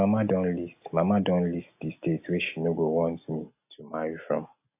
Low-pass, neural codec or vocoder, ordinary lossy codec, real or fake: 3.6 kHz; none; AAC, 32 kbps; real